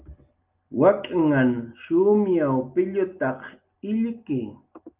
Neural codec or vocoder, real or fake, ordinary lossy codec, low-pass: none; real; Opus, 32 kbps; 3.6 kHz